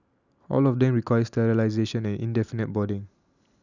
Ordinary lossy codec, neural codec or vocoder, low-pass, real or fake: none; none; 7.2 kHz; real